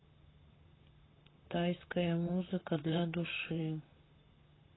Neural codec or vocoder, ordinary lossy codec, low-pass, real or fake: vocoder, 44.1 kHz, 128 mel bands, Pupu-Vocoder; AAC, 16 kbps; 7.2 kHz; fake